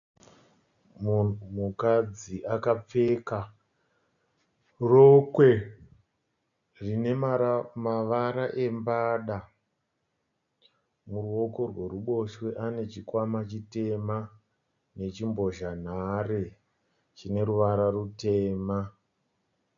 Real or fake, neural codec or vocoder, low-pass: real; none; 7.2 kHz